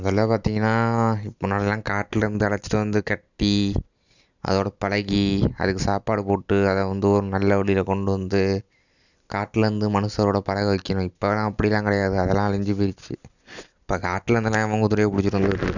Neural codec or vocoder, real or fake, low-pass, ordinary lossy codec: none; real; 7.2 kHz; none